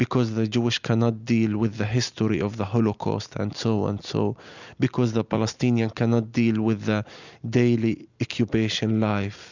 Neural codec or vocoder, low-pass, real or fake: none; 7.2 kHz; real